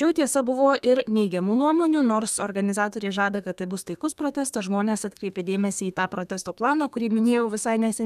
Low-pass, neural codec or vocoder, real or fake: 14.4 kHz; codec, 32 kHz, 1.9 kbps, SNAC; fake